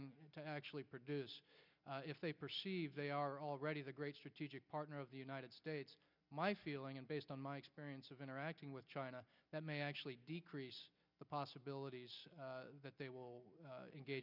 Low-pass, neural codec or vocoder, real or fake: 5.4 kHz; none; real